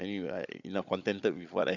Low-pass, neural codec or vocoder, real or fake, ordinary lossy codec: 7.2 kHz; codec, 16 kHz, 16 kbps, FunCodec, trained on Chinese and English, 50 frames a second; fake; none